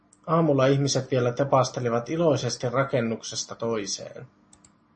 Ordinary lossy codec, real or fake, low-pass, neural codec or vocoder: MP3, 32 kbps; real; 9.9 kHz; none